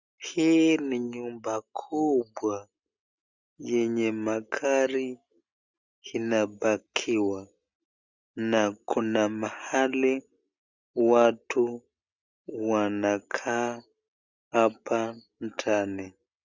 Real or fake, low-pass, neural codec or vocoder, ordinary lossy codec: real; 7.2 kHz; none; Opus, 64 kbps